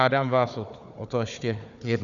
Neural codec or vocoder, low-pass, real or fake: codec, 16 kHz, 4 kbps, FunCodec, trained on Chinese and English, 50 frames a second; 7.2 kHz; fake